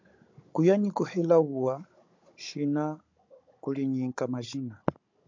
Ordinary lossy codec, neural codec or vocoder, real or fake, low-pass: MP3, 64 kbps; codec, 16 kHz, 16 kbps, FunCodec, trained on Chinese and English, 50 frames a second; fake; 7.2 kHz